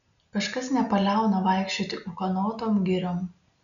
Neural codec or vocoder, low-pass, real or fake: none; 7.2 kHz; real